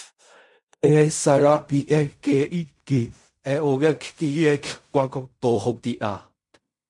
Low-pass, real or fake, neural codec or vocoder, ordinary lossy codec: 10.8 kHz; fake; codec, 16 kHz in and 24 kHz out, 0.4 kbps, LongCat-Audio-Codec, fine tuned four codebook decoder; MP3, 64 kbps